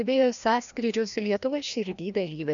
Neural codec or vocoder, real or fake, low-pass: codec, 16 kHz, 1 kbps, FreqCodec, larger model; fake; 7.2 kHz